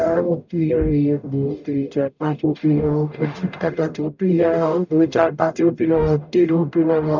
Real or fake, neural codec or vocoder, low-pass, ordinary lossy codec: fake; codec, 44.1 kHz, 0.9 kbps, DAC; 7.2 kHz; none